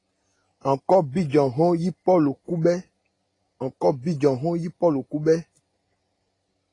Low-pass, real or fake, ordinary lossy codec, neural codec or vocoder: 10.8 kHz; real; AAC, 32 kbps; none